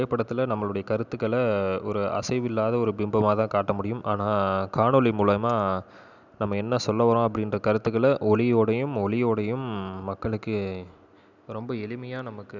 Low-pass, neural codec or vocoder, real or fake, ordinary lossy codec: 7.2 kHz; none; real; none